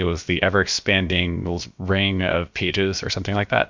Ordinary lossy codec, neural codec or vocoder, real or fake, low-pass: MP3, 64 kbps; codec, 16 kHz, about 1 kbps, DyCAST, with the encoder's durations; fake; 7.2 kHz